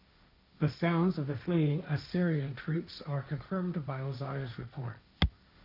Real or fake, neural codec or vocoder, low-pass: fake; codec, 16 kHz, 1.1 kbps, Voila-Tokenizer; 5.4 kHz